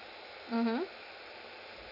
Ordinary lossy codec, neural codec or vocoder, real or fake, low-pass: none; none; real; 5.4 kHz